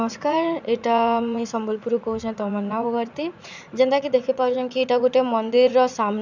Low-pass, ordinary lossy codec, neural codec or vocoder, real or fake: 7.2 kHz; none; vocoder, 44.1 kHz, 80 mel bands, Vocos; fake